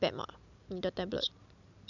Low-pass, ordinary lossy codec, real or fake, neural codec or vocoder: 7.2 kHz; none; real; none